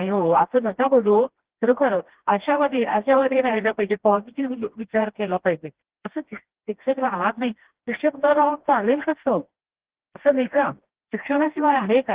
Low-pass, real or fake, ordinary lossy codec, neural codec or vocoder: 3.6 kHz; fake; Opus, 16 kbps; codec, 16 kHz, 1 kbps, FreqCodec, smaller model